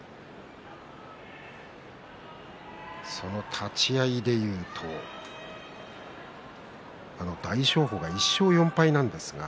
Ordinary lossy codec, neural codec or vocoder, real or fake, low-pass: none; none; real; none